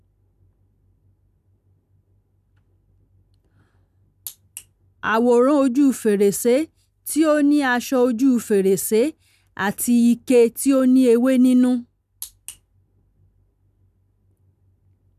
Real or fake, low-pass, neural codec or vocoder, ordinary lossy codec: real; 14.4 kHz; none; none